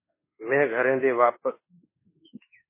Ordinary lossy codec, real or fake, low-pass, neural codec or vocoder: MP3, 16 kbps; fake; 3.6 kHz; autoencoder, 48 kHz, 32 numbers a frame, DAC-VAE, trained on Japanese speech